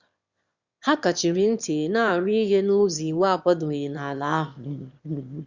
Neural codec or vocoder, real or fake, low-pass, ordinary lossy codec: autoencoder, 22.05 kHz, a latent of 192 numbers a frame, VITS, trained on one speaker; fake; 7.2 kHz; Opus, 64 kbps